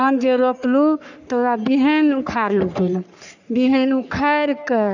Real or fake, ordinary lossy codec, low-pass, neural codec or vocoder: fake; none; 7.2 kHz; codec, 44.1 kHz, 3.4 kbps, Pupu-Codec